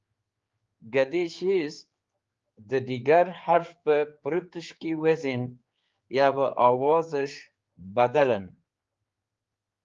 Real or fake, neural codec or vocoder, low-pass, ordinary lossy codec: fake; codec, 16 kHz, 4 kbps, X-Codec, HuBERT features, trained on general audio; 7.2 kHz; Opus, 32 kbps